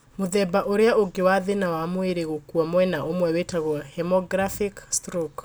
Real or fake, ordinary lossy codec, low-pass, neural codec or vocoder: real; none; none; none